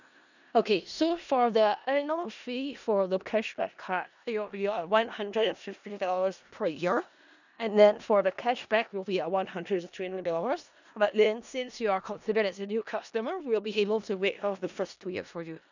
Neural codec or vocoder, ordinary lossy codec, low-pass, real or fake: codec, 16 kHz in and 24 kHz out, 0.4 kbps, LongCat-Audio-Codec, four codebook decoder; none; 7.2 kHz; fake